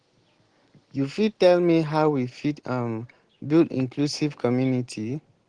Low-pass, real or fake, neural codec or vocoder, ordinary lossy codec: 9.9 kHz; real; none; Opus, 16 kbps